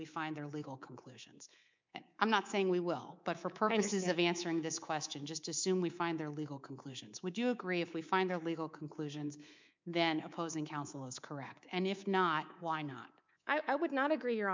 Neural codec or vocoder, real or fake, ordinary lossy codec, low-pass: codec, 24 kHz, 3.1 kbps, DualCodec; fake; MP3, 64 kbps; 7.2 kHz